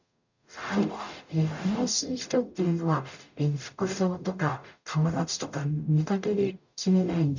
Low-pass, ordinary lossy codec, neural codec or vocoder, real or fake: 7.2 kHz; none; codec, 44.1 kHz, 0.9 kbps, DAC; fake